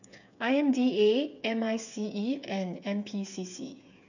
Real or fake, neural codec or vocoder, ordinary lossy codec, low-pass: fake; codec, 16 kHz, 8 kbps, FreqCodec, smaller model; none; 7.2 kHz